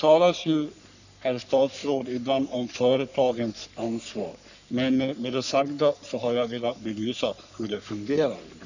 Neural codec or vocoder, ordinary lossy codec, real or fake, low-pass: codec, 44.1 kHz, 3.4 kbps, Pupu-Codec; none; fake; 7.2 kHz